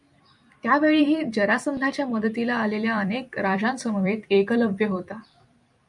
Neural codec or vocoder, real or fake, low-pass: vocoder, 44.1 kHz, 128 mel bands every 256 samples, BigVGAN v2; fake; 10.8 kHz